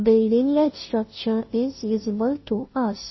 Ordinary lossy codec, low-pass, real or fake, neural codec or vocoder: MP3, 24 kbps; 7.2 kHz; fake; codec, 16 kHz, 0.5 kbps, FunCodec, trained on Chinese and English, 25 frames a second